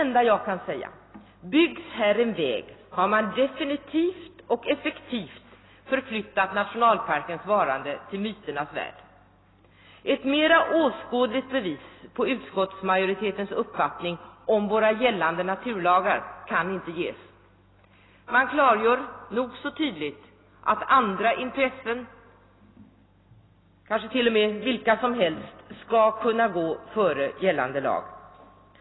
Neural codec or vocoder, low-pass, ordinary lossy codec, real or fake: none; 7.2 kHz; AAC, 16 kbps; real